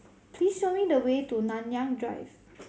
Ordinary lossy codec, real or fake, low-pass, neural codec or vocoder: none; real; none; none